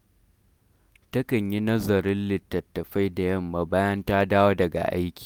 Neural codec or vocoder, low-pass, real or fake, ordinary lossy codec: none; none; real; none